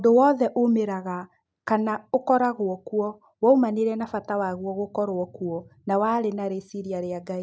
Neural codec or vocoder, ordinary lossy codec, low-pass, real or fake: none; none; none; real